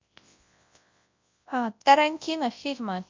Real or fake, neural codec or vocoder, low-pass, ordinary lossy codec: fake; codec, 24 kHz, 0.9 kbps, WavTokenizer, large speech release; 7.2 kHz; MP3, 64 kbps